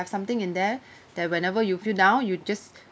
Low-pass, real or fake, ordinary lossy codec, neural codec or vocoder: none; real; none; none